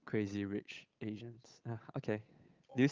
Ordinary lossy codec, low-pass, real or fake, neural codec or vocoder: Opus, 24 kbps; 7.2 kHz; fake; codec, 16 kHz, 8 kbps, FunCodec, trained on Chinese and English, 25 frames a second